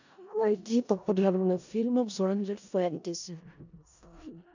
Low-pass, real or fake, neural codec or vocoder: 7.2 kHz; fake; codec, 16 kHz in and 24 kHz out, 0.4 kbps, LongCat-Audio-Codec, four codebook decoder